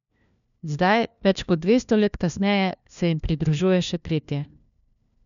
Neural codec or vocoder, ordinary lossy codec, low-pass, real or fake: codec, 16 kHz, 1 kbps, FunCodec, trained on LibriTTS, 50 frames a second; none; 7.2 kHz; fake